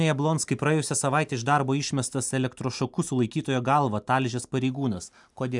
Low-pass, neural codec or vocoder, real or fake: 10.8 kHz; none; real